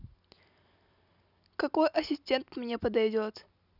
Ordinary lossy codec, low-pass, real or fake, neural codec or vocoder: none; 5.4 kHz; real; none